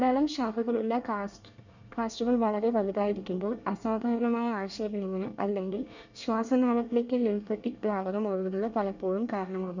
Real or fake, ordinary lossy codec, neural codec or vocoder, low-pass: fake; none; codec, 24 kHz, 1 kbps, SNAC; 7.2 kHz